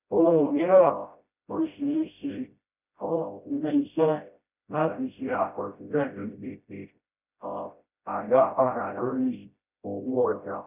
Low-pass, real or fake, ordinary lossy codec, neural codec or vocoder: 3.6 kHz; fake; none; codec, 16 kHz, 0.5 kbps, FreqCodec, smaller model